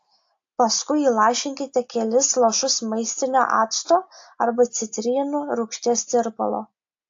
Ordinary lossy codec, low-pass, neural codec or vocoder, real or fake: AAC, 48 kbps; 7.2 kHz; none; real